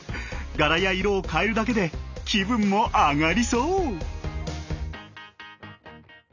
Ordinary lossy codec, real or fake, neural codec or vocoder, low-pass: none; real; none; 7.2 kHz